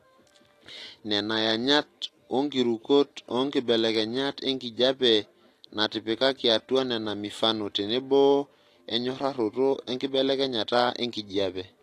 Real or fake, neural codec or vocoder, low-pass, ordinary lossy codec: real; none; 14.4 kHz; AAC, 48 kbps